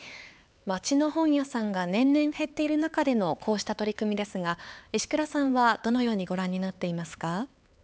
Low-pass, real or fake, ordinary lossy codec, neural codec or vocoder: none; fake; none; codec, 16 kHz, 4 kbps, X-Codec, HuBERT features, trained on LibriSpeech